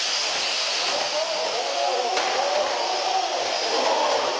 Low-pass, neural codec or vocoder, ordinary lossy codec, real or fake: none; none; none; real